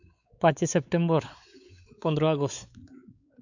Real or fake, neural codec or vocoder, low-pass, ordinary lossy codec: fake; codec, 16 kHz, 4 kbps, X-Codec, WavLM features, trained on Multilingual LibriSpeech; 7.2 kHz; none